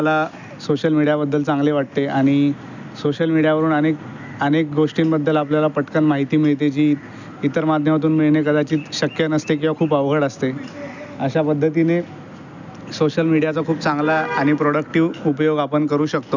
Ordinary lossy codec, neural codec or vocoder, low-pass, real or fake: none; none; 7.2 kHz; real